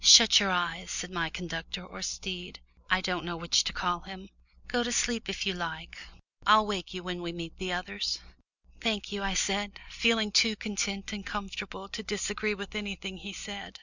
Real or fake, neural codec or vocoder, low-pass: real; none; 7.2 kHz